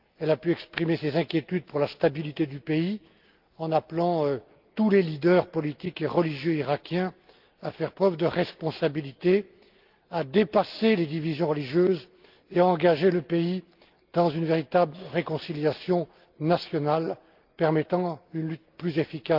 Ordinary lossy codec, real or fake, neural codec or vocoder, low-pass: Opus, 32 kbps; real; none; 5.4 kHz